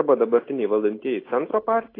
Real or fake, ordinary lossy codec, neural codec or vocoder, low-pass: real; AAC, 24 kbps; none; 5.4 kHz